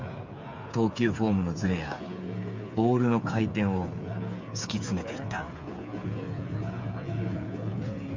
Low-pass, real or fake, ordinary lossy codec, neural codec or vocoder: 7.2 kHz; fake; MP3, 48 kbps; codec, 16 kHz, 8 kbps, FreqCodec, smaller model